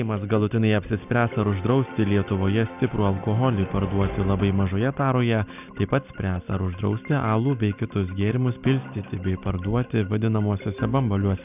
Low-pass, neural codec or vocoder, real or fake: 3.6 kHz; none; real